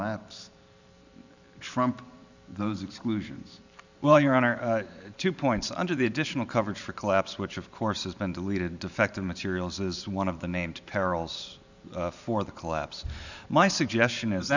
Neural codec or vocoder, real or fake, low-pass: vocoder, 44.1 kHz, 128 mel bands every 512 samples, BigVGAN v2; fake; 7.2 kHz